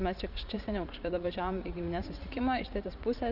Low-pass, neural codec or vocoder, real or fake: 5.4 kHz; vocoder, 44.1 kHz, 80 mel bands, Vocos; fake